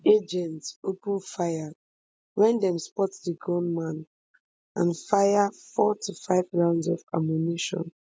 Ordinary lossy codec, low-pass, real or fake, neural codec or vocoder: none; none; real; none